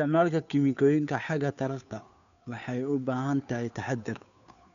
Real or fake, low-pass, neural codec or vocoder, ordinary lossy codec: fake; 7.2 kHz; codec, 16 kHz, 2 kbps, FunCodec, trained on Chinese and English, 25 frames a second; none